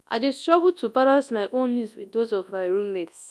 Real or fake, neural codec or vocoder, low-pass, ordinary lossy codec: fake; codec, 24 kHz, 0.9 kbps, WavTokenizer, large speech release; none; none